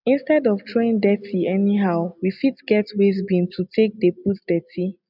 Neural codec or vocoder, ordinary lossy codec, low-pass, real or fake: none; none; 5.4 kHz; real